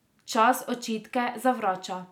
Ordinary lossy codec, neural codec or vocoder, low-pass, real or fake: none; none; 19.8 kHz; real